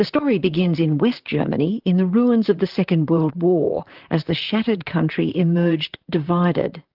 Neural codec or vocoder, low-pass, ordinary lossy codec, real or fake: vocoder, 44.1 kHz, 128 mel bands, Pupu-Vocoder; 5.4 kHz; Opus, 24 kbps; fake